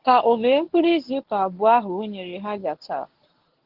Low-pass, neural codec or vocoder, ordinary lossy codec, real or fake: 5.4 kHz; codec, 24 kHz, 0.9 kbps, WavTokenizer, medium speech release version 1; Opus, 16 kbps; fake